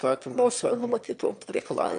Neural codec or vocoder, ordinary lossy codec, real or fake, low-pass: autoencoder, 22.05 kHz, a latent of 192 numbers a frame, VITS, trained on one speaker; MP3, 64 kbps; fake; 9.9 kHz